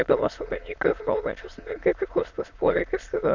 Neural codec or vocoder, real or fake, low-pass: autoencoder, 22.05 kHz, a latent of 192 numbers a frame, VITS, trained on many speakers; fake; 7.2 kHz